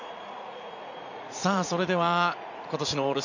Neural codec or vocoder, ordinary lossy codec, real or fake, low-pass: vocoder, 44.1 kHz, 80 mel bands, Vocos; none; fake; 7.2 kHz